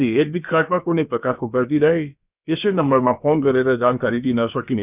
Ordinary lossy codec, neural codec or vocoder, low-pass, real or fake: none; codec, 16 kHz, about 1 kbps, DyCAST, with the encoder's durations; 3.6 kHz; fake